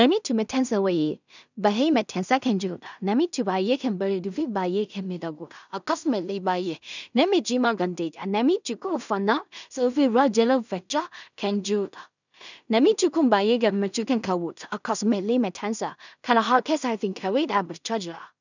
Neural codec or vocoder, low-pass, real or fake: codec, 16 kHz in and 24 kHz out, 0.4 kbps, LongCat-Audio-Codec, two codebook decoder; 7.2 kHz; fake